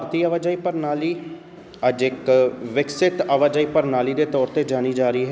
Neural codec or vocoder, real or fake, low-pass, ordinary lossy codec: none; real; none; none